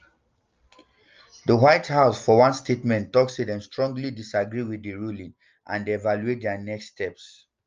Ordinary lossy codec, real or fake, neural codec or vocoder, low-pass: Opus, 24 kbps; real; none; 7.2 kHz